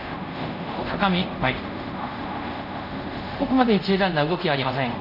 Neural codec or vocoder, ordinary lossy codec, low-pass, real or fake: codec, 24 kHz, 0.5 kbps, DualCodec; none; 5.4 kHz; fake